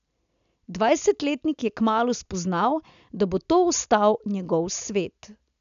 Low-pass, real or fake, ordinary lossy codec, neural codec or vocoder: 7.2 kHz; real; none; none